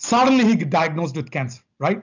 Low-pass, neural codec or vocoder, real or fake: 7.2 kHz; none; real